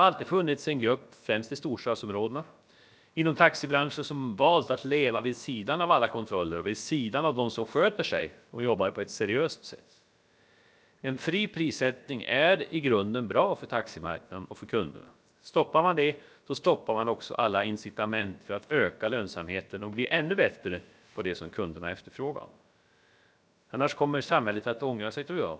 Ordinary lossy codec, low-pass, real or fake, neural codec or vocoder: none; none; fake; codec, 16 kHz, about 1 kbps, DyCAST, with the encoder's durations